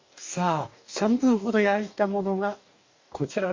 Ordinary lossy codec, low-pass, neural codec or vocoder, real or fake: MP3, 48 kbps; 7.2 kHz; codec, 44.1 kHz, 2.6 kbps, DAC; fake